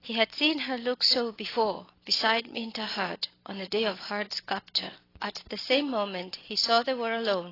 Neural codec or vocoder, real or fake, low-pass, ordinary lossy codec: none; real; 5.4 kHz; AAC, 24 kbps